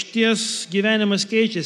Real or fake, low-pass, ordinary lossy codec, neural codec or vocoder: real; 14.4 kHz; MP3, 96 kbps; none